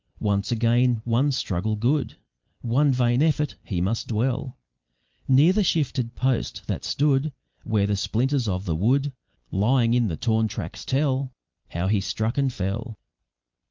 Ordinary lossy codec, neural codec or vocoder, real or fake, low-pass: Opus, 24 kbps; none; real; 7.2 kHz